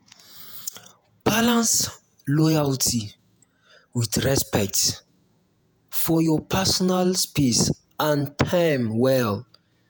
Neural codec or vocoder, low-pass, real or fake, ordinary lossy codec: vocoder, 48 kHz, 128 mel bands, Vocos; none; fake; none